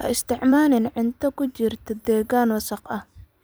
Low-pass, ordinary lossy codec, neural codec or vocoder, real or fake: none; none; vocoder, 44.1 kHz, 128 mel bands every 256 samples, BigVGAN v2; fake